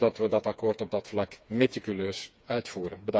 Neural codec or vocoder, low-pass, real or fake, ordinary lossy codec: codec, 16 kHz, 4 kbps, FreqCodec, smaller model; none; fake; none